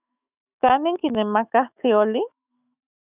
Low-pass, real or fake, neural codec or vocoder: 3.6 kHz; fake; autoencoder, 48 kHz, 128 numbers a frame, DAC-VAE, trained on Japanese speech